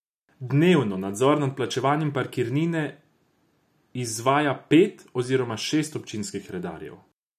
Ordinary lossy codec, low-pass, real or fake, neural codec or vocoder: none; 14.4 kHz; real; none